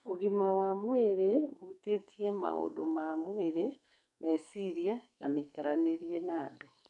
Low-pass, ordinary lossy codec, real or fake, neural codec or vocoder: 10.8 kHz; none; fake; codec, 44.1 kHz, 2.6 kbps, SNAC